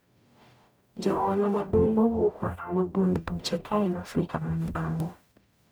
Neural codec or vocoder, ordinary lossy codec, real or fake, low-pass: codec, 44.1 kHz, 0.9 kbps, DAC; none; fake; none